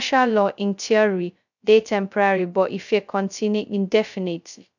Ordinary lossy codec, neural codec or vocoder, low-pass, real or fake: none; codec, 16 kHz, 0.2 kbps, FocalCodec; 7.2 kHz; fake